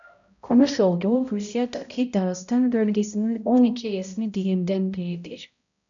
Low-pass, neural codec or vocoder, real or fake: 7.2 kHz; codec, 16 kHz, 0.5 kbps, X-Codec, HuBERT features, trained on balanced general audio; fake